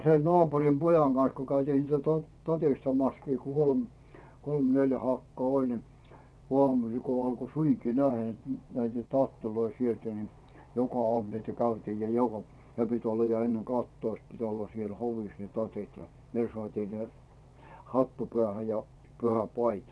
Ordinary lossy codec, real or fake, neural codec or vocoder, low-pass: none; fake; vocoder, 22.05 kHz, 80 mel bands, WaveNeXt; none